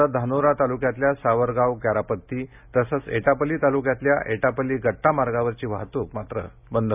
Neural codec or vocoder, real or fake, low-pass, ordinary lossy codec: none; real; 3.6 kHz; none